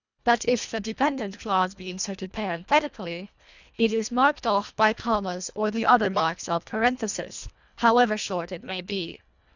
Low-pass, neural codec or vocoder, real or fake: 7.2 kHz; codec, 24 kHz, 1.5 kbps, HILCodec; fake